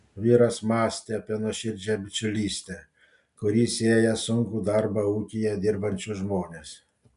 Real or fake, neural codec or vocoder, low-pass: real; none; 10.8 kHz